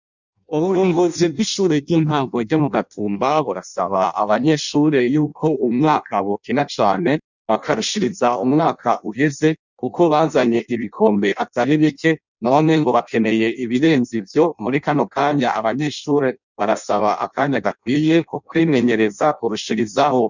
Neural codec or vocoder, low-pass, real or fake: codec, 16 kHz in and 24 kHz out, 0.6 kbps, FireRedTTS-2 codec; 7.2 kHz; fake